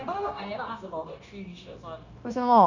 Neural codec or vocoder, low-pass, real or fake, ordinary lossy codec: codec, 16 kHz, 0.9 kbps, LongCat-Audio-Codec; 7.2 kHz; fake; none